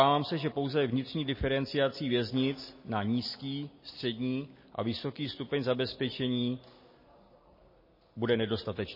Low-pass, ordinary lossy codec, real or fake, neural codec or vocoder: 5.4 kHz; MP3, 24 kbps; real; none